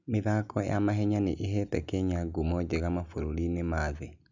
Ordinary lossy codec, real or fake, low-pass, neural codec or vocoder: none; real; 7.2 kHz; none